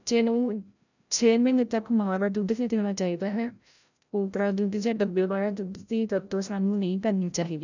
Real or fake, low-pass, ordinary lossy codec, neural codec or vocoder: fake; 7.2 kHz; none; codec, 16 kHz, 0.5 kbps, FreqCodec, larger model